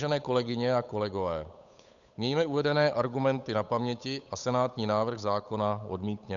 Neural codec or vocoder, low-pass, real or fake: codec, 16 kHz, 8 kbps, FunCodec, trained on Chinese and English, 25 frames a second; 7.2 kHz; fake